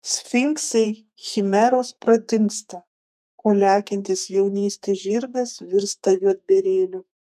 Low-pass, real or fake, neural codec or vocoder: 14.4 kHz; fake; codec, 32 kHz, 1.9 kbps, SNAC